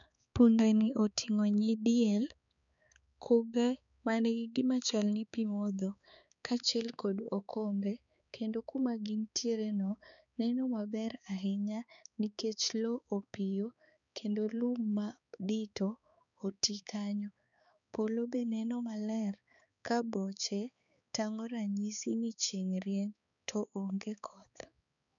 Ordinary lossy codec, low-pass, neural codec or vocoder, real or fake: none; 7.2 kHz; codec, 16 kHz, 4 kbps, X-Codec, HuBERT features, trained on balanced general audio; fake